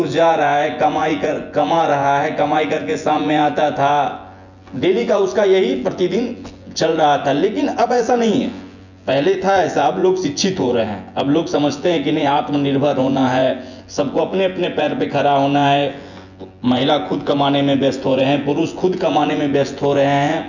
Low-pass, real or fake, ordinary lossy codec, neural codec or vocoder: 7.2 kHz; fake; none; vocoder, 24 kHz, 100 mel bands, Vocos